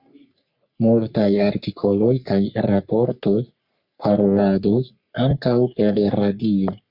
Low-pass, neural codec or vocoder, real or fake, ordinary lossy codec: 5.4 kHz; codec, 44.1 kHz, 3.4 kbps, Pupu-Codec; fake; Opus, 64 kbps